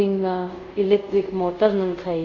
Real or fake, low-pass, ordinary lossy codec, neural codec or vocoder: fake; 7.2 kHz; none; codec, 24 kHz, 0.5 kbps, DualCodec